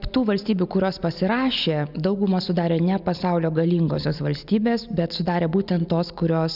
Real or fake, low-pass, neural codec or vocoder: real; 5.4 kHz; none